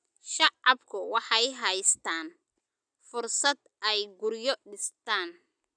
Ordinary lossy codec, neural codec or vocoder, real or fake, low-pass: none; none; real; 9.9 kHz